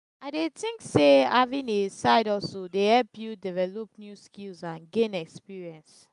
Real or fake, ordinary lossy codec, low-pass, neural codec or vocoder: real; MP3, 96 kbps; 10.8 kHz; none